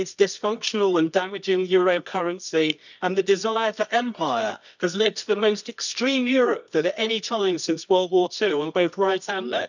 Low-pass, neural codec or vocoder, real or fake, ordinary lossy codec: 7.2 kHz; codec, 24 kHz, 0.9 kbps, WavTokenizer, medium music audio release; fake; none